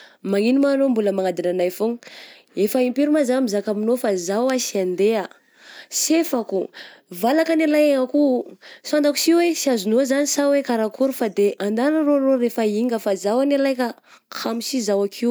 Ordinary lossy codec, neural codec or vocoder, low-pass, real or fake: none; none; none; real